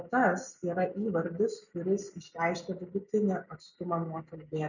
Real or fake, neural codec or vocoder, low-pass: real; none; 7.2 kHz